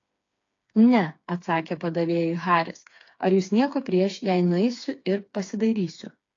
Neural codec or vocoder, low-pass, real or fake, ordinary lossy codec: codec, 16 kHz, 4 kbps, FreqCodec, smaller model; 7.2 kHz; fake; AAC, 48 kbps